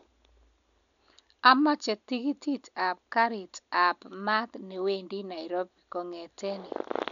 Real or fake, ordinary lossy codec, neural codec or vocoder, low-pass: real; none; none; 7.2 kHz